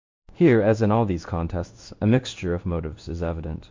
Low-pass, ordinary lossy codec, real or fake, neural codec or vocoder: 7.2 kHz; MP3, 48 kbps; fake; codec, 16 kHz in and 24 kHz out, 1 kbps, XY-Tokenizer